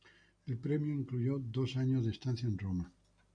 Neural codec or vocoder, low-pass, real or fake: none; 9.9 kHz; real